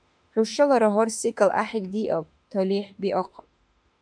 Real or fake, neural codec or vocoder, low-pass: fake; autoencoder, 48 kHz, 32 numbers a frame, DAC-VAE, trained on Japanese speech; 9.9 kHz